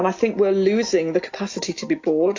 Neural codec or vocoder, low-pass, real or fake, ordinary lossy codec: none; 7.2 kHz; real; AAC, 48 kbps